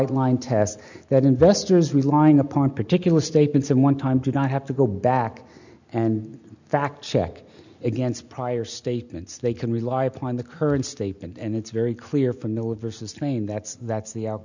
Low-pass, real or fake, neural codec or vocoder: 7.2 kHz; real; none